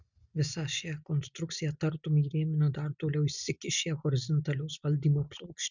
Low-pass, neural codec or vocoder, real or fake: 7.2 kHz; none; real